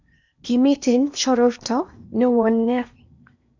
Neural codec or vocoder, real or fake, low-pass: codec, 16 kHz in and 24 kHz out, 0.8 kbps, FocalCodec, streaming, 65536 codes; fake; 7.2 kHz